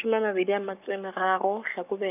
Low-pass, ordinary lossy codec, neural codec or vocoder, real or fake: 3.6 kHz; none; codec, 44.1 kHz, 7.8 kbps, Pupu-Codec; fake